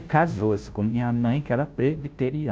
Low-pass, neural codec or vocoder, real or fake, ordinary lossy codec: none; codec, 16 kHz, 0.5 kbps, FunCodec, trained on Chinese and English, 25 frames a second; fake; none